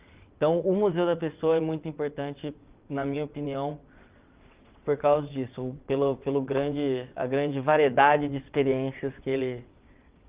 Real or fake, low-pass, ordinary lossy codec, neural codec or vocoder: fake; 3.6 kHz; Opus, 24 kbps; vocoder, 22.05 kHz, 80 mel bands, WaveNeXt